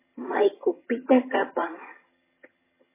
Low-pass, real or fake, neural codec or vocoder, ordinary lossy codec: 3.6 kHz; fake; vocoder, 22.05 kHz, 80 mel bands, HiFi-GAN; MP3, 16 kbps